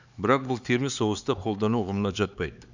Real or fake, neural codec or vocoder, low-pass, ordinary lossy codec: fake; codec, 16 kHz, 4 kbps, X-Codec, HuBERT features, trained on LibriSpeech; 7.2 kHz; Opus, 64 kbps